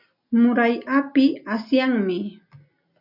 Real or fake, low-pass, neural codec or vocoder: real; 5.4 kHz; none